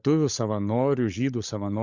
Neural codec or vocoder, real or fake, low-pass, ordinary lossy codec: codec, 16 kHz, 16 kbps, FunCodec, trained on LibriTTS, 50 frames a second; fake; 7.2 kHz; Opus, 64 kbps